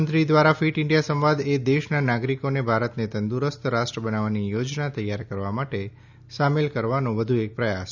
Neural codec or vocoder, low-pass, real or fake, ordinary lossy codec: none; 7.2 kHz; real; none